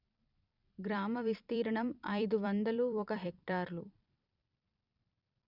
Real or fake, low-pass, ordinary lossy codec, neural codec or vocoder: fake; 5.4 kHz; none; vocoder, 44.1 kHz, 128 mel bands every 256 samples, BigVGAN v2